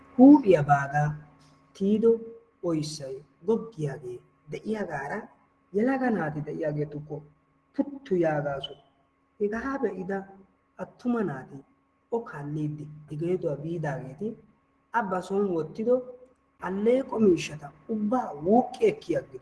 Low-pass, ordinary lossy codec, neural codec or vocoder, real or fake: 10.8 kHz; Opus, 16 kbps; none; real